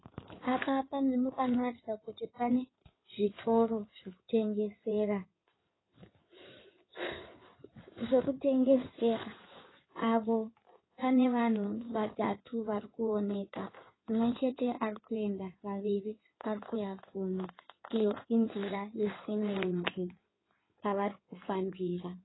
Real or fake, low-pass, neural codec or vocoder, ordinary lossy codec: fake; 7.2 kHz; codec, 16 kHz in and 24 kHz out, 2.2 kbps, FireRedTTS-2 codec; AAC, 16 kbps